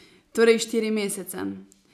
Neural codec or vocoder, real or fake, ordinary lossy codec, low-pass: none; real; none; 14.4 kHz